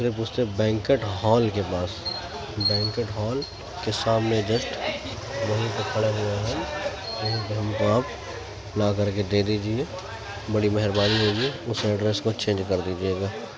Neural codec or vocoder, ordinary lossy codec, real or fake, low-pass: none; none; real; none